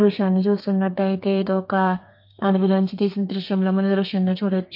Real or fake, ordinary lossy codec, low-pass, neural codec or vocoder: fake; MP3, 32 kbps; 5.4 kHz; codec, 32 kHz, 1.9 kbps, SNAC